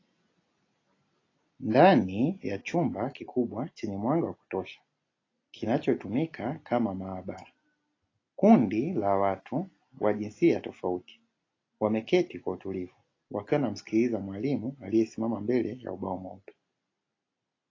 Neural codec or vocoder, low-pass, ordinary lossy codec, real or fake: none; 7.2 kHz; AAC, 32 kbps; real